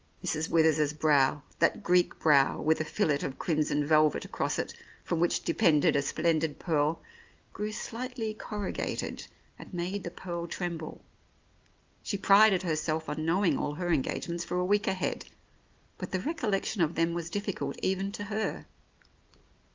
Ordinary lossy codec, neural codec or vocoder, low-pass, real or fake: Opus, 24 kbps; none; 7.2 kHz; real